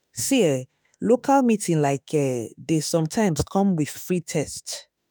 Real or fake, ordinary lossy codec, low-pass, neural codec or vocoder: fake; none; none; autoencoder, 48 kHz, 32 numbers a frame, DAC-VAE, trained on Japanese speech